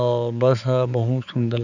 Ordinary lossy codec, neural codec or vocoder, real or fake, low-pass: none; codec, 16 kHz, 4 kbps, X-Codec, HuBERT features, trained on balanced general audio; fake; 7.2 kHz